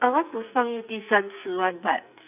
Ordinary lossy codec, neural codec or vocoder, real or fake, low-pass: none; codec, 44.1 kHz, 2.6 kbps, SNAC; fake; 3.6 kHz